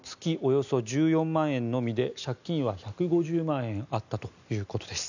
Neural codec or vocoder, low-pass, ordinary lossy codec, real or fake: none; 7.2 kHz; none; real